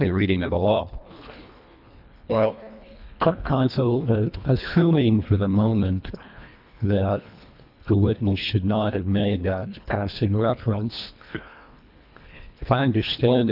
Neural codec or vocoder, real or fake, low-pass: codec, 24 kHz, 1.5 kbps, HILCodec; fake; 5.4 kHz